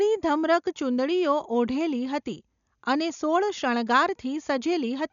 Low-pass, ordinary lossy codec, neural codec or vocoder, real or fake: 7.2 kHz; MP3, 96 kbps; none; real